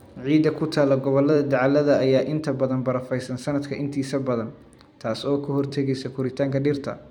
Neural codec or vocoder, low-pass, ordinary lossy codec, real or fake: vocoder, 44.1 kHz, 128 mel bands every 256 samples, BigVGAN v2; 19.8 kHz; none; fake